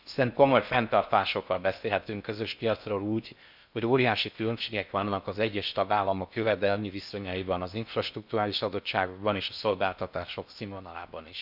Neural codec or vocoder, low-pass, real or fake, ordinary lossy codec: codec, 16 kHz in and 24 kHz out, 0.6 kbps, FocalCodec, streaming, 4096 codes; 5.4 kHz; fake; none